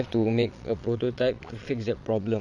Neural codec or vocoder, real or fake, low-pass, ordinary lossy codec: vocoder, 22.05 kHz, 80 mel bands, Vocos; fake; none; none